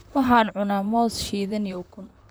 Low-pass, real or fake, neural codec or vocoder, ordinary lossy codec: none; fake; vocoder, 44.1 kHz, 128 mel bands, Pupu-Vocoder; none